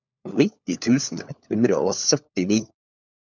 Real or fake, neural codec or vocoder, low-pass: fake; codec, 16 kHz, 4 kbps, FunCodec, trained on LibriTTS, 50 frames a second; 7.2 kHz